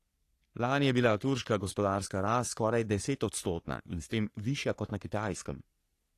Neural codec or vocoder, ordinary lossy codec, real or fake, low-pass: codec, 44.1 kHz, 3.4 kbps, Pupu-Codec; AAC, 48 kbps; fake; 14.4 kHz